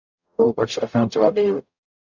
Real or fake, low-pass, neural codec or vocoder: fake; 7.2 kHz; codec, 44.1 kHz, 0.9 kbps, DAC